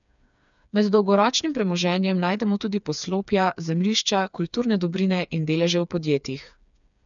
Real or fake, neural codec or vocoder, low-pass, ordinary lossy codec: fake; codec, 16 kHz, 4 kbps, FreqCodec, smaller model; 7.2 kHz; none